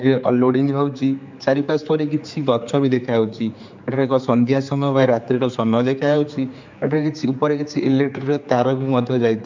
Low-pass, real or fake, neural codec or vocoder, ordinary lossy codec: 7.2 kHz; fake; codec, 16 kHz, 4 kbps, X-Codec, HuBERT features, trained on general audio; MP3, 64 kbps